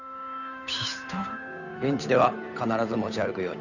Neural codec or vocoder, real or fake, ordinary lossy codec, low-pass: codec, 16 kHz, 8 kbps, FunCodec, trained on Chinese and English, 25 frames a second; fake; none; 7.2 kHz